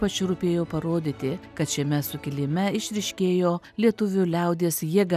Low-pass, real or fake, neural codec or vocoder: 14.4 kHz; real; none